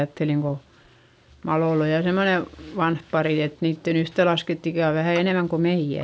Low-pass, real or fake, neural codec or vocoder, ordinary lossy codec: none; real; none; none